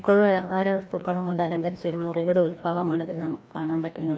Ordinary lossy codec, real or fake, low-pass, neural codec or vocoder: none; fake; none; codec, 16 kHz, 1 kbps, FreqCodec, larger model